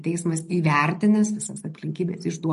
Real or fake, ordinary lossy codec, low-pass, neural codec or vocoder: real; MP3, 48 kbps; 14.4 kHz; none